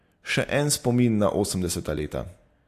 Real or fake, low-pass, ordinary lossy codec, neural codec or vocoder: real; 14.4 kHz; AAC, 64 kbps; none